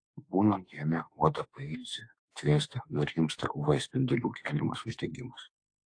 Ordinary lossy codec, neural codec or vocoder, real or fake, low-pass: AAC, 48 kbps; autoencoder, 48 kHz, 32 numbers a frame, DAC-VAE, trained on Japanese speech; fake; 9.9 kHz